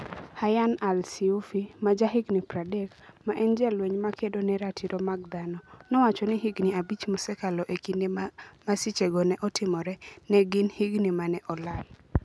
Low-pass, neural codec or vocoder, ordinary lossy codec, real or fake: none; none; none; real